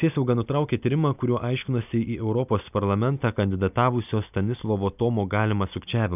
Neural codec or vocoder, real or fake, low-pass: none; real; 3.6 kHz